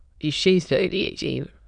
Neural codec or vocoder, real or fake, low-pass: autoencoder, 22.05 kHz, a latent of 192 numbers a frame, VITS, trained on many speakers; fake; 9.9 kHz